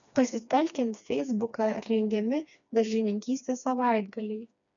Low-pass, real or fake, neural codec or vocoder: 7.2 kHz; fake; codec, 16 kHz, 2 kbps, FreqCodec, smaller model